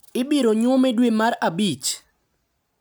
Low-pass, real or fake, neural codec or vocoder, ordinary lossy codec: none; real; none; none